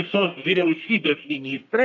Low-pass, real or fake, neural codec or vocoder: 7.2 kHz; fake; codec, 44.1 kHz, 1.7 kbps, Pupu-Codec